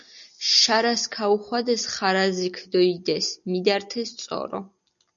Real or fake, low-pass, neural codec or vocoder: real; 7.2 kHz; none